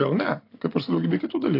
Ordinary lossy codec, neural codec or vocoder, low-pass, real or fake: AAC, 32 kbps; none; 5.4 kHz; real